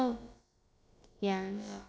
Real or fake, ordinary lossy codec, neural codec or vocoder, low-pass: fake; none; codec, 16 kHz, about 1 kbps, DyCAST, with the encoder's durations; none